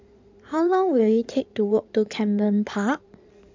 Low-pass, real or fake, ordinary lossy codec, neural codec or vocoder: 7.2 kHz; fake; MP3, 64 kbps; codec, 16 kHz in and 24 kHz out, 2.2 kbps, FireRedTTS-2 codec